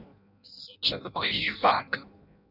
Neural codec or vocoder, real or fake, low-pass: codec, 16 kHz in and 24 kHz out, 0.6 kbps, FireRedTTS-2 codec; fake; 5.4 kHz